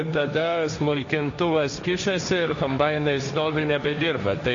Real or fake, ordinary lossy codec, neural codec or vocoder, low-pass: fake; MP3, 48 kbps; codec, 16 kHz, 1.1 kbps, Voila-Tokenizer; 7.2 kHz